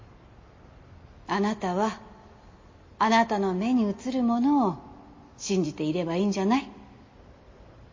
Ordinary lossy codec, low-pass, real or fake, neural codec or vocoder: MP3, 32 kbps; 7.2 kHz; real; none